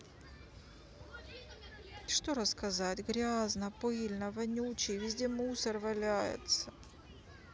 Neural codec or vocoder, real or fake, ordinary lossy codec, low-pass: none; real; none; none